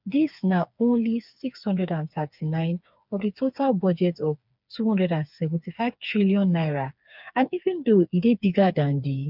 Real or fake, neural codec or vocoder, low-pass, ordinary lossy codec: fake; codec, 16 kHz, 4 kbps, FreqCodec, smaller model; 5.4 kHz; none